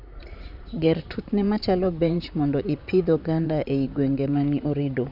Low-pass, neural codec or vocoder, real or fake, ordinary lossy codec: 5.4 kHz; vocoder, 44.1 kHz, 128 mel bands, Pupu-Vocoder; fake; none